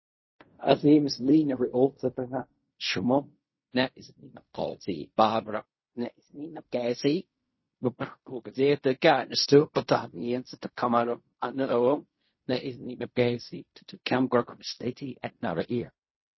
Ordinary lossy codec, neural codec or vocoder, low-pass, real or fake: MP3, 24 kbps; codec, 16 kHz in and 24 kHz out, 0.4 kbps, LongCat-Audio-Codec, fine tuned four codebook decoder; 7.2 kHz; fake